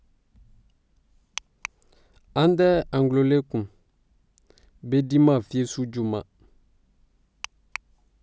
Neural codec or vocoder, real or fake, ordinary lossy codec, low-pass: none; real; none; none